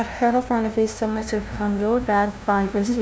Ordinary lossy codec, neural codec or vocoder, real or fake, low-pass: none; codec, 16 kHz, 0.5 kbps, FunCodec, trained on LibriTTS, 25 frames a second; fake; none